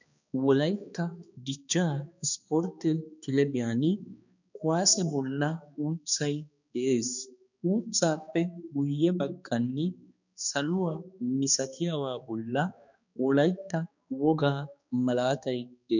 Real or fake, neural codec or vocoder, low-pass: fake; codec, 16 kHz, 2 kbps, X-Codec, HuBERT features, trained on balanced general audio; 7.2 kHz